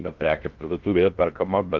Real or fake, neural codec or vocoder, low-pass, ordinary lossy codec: fake; codec, 16 kHz in and 24 kHz out, 0.6 kbps, FocalCodec, streaming, 4096 codes; 7.2 kHz; Opus, 32 kbps